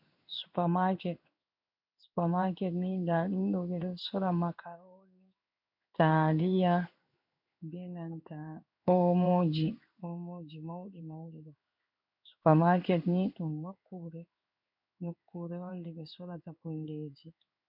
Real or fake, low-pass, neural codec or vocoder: fake; 5.4 kHz; codec, 16 kHz in and 24 kHz out, 1 kbps, XY-Tokenizer